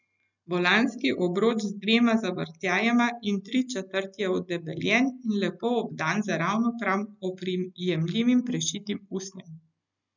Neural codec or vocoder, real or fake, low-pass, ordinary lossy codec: none; real; 7.2 kHz; none